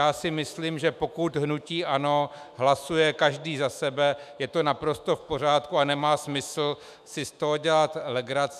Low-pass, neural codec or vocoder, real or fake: 14.4 kHz; autoencoder, 48 kHz, 128 numbers a frame, DAC-VAE, trained on Japanese speech; fake